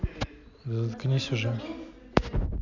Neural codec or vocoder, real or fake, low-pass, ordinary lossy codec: none; real; 7.2 kHz; none